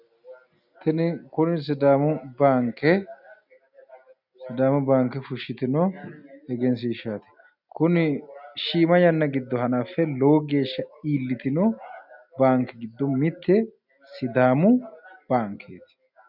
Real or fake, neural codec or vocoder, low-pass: real; none; 5.4 kHz